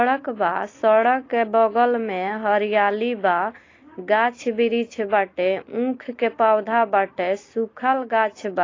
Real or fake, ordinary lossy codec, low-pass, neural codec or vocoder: real; AAC, 32 kbps; 7.2 kHz; none